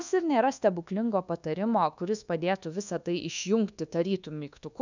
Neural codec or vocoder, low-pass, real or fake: codec, 24 kHz, 1.2 kbps, DualCodec; 7.2 kHz; fake